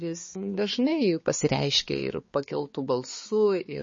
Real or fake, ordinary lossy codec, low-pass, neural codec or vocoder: fake; MP3, 32 kbps; 7.2 kHz; codec, 16 kHz, 4 kbps, X-Codec, HuBERT features, trained on balanced general audio